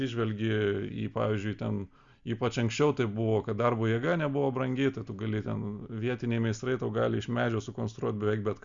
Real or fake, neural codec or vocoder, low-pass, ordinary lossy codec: real; none; 7.2 kHz; Opus, 64 kbps